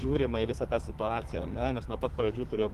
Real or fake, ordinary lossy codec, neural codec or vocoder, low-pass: fake; Opus, 24 kbps; codec, 32 kHz, 1.9 kbps, SNAC; 14.4 kHz